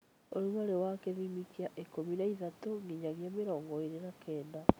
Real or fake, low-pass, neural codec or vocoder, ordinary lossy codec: real; none; none; none